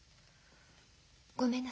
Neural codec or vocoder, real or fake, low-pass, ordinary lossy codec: none; real; none; none